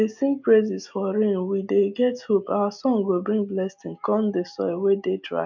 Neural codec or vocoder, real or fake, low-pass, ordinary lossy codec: none; real; 7.2 kHz; MP3, 64 kbps